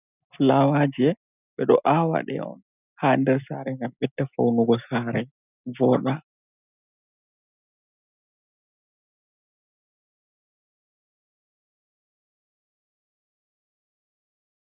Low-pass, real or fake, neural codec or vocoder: 3.6 kHz; real; none